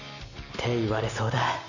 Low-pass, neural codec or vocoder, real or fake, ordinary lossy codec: 7.2 kHz; none; real; none